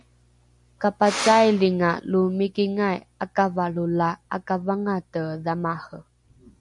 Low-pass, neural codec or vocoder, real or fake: 10.8 kHz; none; real